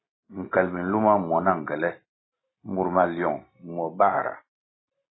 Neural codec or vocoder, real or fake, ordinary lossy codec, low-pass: none; real; AAC, 16 kbps; 7.2 kHz